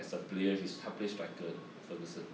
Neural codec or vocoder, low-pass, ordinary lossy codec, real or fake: none; none; none; real